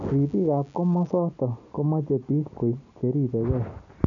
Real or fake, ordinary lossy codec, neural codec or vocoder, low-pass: real; none; none; 7.2 kHz